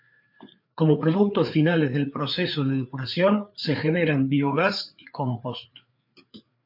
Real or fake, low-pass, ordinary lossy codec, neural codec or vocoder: fake; 5.4 kHz; MP3, 48 kbps; codec, 16 kHz, 4 kbps, FreqCodec, larger model